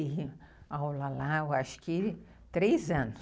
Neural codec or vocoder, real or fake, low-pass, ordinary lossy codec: none; real; none; none